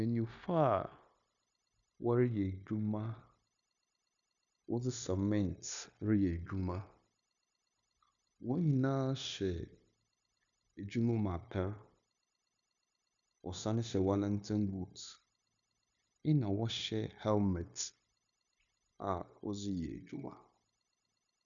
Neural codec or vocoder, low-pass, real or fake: codec, 16 kHz, 0.9 kbps, LongCat-Audio-Codec; 7.2 kHz; fake